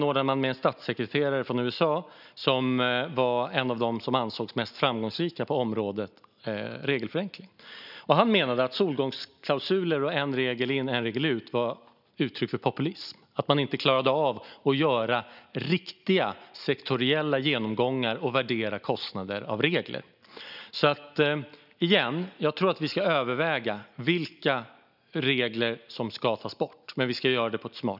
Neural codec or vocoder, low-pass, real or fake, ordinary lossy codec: none; 5.4 kHz; real; none